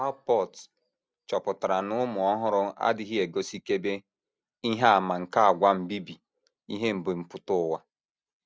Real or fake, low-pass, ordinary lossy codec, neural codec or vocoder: real; none; none; none